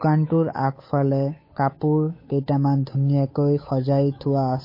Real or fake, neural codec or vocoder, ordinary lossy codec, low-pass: fake; codec, 16 kHz, 8 kbps, FunCodec, trained on Chinese and English, 25 frames a second; MP3, 24 kbps; 5.4 kHz